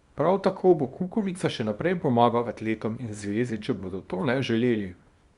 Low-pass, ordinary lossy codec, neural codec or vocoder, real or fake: 10.8 kHz; Opus, 64 kbps; codec, 24 kHz, 0.9 kbps, WavTokenizer, small release; fake